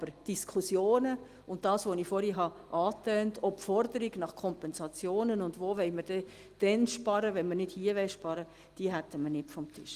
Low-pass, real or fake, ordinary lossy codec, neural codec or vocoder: 14.4 kHz; real; Opus, 24 kbps; none